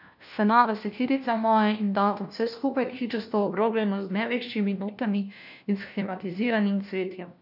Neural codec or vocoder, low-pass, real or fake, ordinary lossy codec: codec, 16 kHz, 1 kbps, FunCodec, trained on LibriTTS, 50 frames a second; 5.4 kHz; fake; none